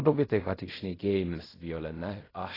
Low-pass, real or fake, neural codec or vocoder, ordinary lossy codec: 5.4 kHz; fake; codec, 16 kHz in and 24 kHz out, 0.4 kbps, LongCat-Audio-Codec, fine tuned four codebook decoder; AAC, 24 kbps